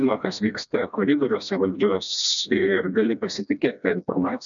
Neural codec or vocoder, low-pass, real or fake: codec, 16 kHz, 1 kbps, FreqCodec, smaller model; 7.2 kHz; fake